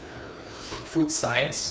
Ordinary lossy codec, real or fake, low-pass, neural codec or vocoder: none; fake; none; codec, 16 kHz, 2 kbps, FreqCodec, larger model